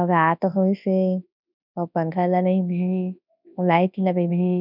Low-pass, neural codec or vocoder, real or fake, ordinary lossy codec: 5.4 kHz; codec, 24 kHz, 0.9 kbps, WavTokenizer, large speech release; fake; none